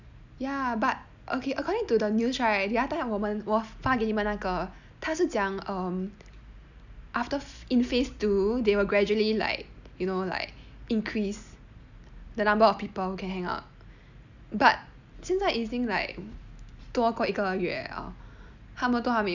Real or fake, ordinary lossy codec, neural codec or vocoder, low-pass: real; none; none; 7.2 kHz